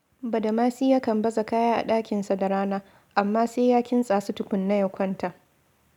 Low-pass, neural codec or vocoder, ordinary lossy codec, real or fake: 19.8 kHz; none; none; real